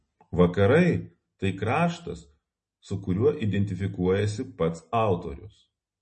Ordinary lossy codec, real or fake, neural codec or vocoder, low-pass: MP3, 32 kbps; real; none; 9.9 kHz